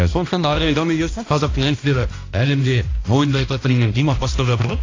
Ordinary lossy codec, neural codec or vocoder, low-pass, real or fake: AAC, 32 kbps; codec, 16 kHz, 1 kbps, X-Codec, HuBERT features, trained on balanced general audio; 7.2 kHz; fake